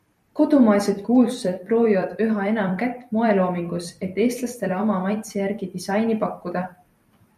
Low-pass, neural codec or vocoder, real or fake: 14.4 kHz; vocoder, 44.1 kHz, 128 mel bands every 256 samples, BigVGAN v2; fake